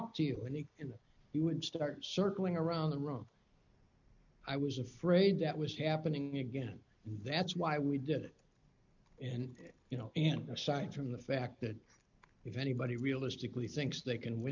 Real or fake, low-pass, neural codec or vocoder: real; 7.2 kHz; none